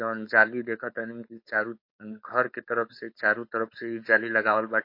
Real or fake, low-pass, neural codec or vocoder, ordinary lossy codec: fake; 5.4 kHz; codec, 16 kHz, 4.8 kbps, FACodec; MP3, 32 kbps